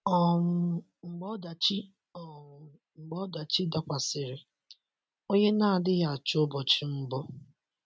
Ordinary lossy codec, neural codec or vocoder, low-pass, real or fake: none; none; none; real